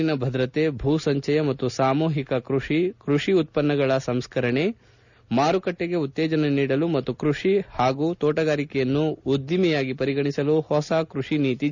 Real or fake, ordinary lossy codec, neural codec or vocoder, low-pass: real; none; none; 7.2 kHz